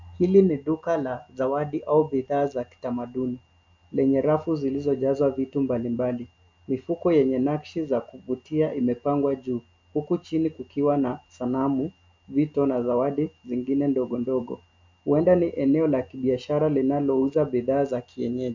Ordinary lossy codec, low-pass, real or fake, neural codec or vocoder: MP3, 64 kbps; 7.2 kHz; real; none